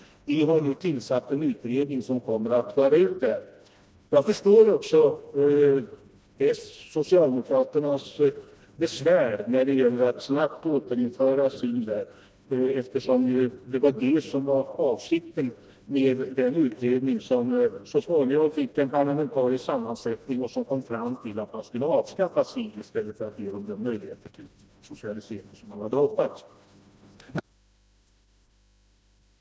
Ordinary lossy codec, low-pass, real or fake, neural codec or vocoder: none; none; fake; codec, 16 kHz, 1 kbps, FreqCodec, smaller model